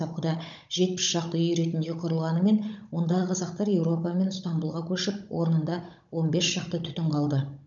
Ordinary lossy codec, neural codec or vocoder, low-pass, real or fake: none; codec, 16 kHz, 16 kbps, FunCodec, trained on Chinese and English, 50 frames a second; 7.2 kHz; fake